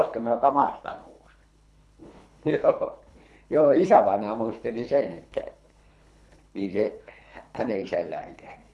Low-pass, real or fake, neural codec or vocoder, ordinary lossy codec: none; fake; codec, 24 kHz, 3 kbps, HILCodec; none